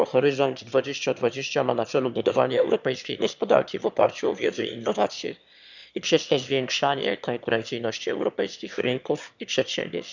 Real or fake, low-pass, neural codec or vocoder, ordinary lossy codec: fake; 7.2 kHz; autoencoder, 22.05 kHz, a latent of 192 numbers a frame, VITS, trained on one speaker; none